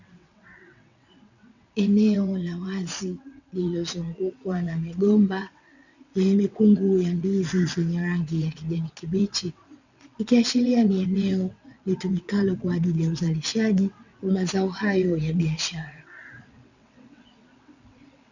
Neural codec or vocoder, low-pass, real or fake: vocoder, 22.05 kHz, 80 mel bands, WaveNeXt; 7.2 kHz; fake